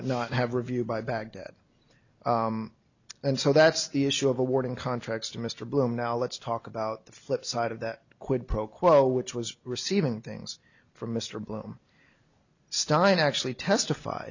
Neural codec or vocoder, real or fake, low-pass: none; real; 7.2 kHz